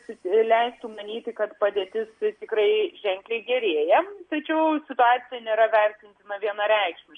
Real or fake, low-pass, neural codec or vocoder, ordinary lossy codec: real; 9.9 kHz; none; MP3, 48 kbps